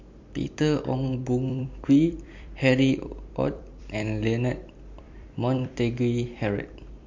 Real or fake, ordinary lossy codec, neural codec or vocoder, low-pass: fake; MP3, 48 kbps; vocoder, 44.1 kHz, 128 mel bands every 256 samples, BigVGAN v2; 7.2 kHz